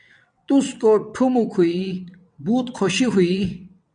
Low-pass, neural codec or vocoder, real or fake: 9.9 kHz; vocoder, 22.05 kHz, 80 mel bands, WaveNeXt; fake